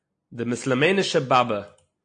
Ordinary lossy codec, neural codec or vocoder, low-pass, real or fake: AAC, 48 kbps; none; 9.9 kHz; real